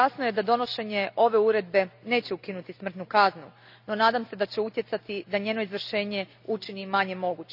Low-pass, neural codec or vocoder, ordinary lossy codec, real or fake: 5.4 kHz; none; none; real